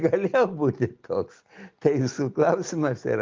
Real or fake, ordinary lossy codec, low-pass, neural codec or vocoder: real; Opus, 32 kbps; 7.2 kHz; none